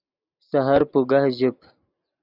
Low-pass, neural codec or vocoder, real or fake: 5.4 kHz; none; real